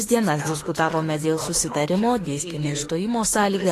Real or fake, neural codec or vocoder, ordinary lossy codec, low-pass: fake; autoencoder, 48 kHz, 32 numbers a frame, DAC-VAE, trained on Japanese speech; AAC, 48 kbps; 14.4 kHz